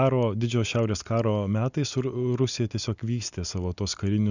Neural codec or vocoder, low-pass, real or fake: none; 7.2 kHz; real